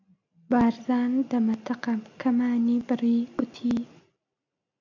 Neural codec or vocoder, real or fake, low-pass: none; real; 7.2 kHz